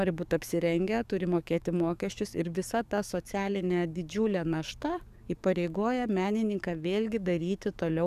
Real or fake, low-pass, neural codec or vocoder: fake; 14.4 kHz; codec, 44.1 kHz, 7.8 kbps, DAC